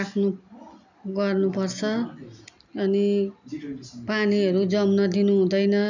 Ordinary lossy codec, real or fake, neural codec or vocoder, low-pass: none; real; none; 7.2 kHz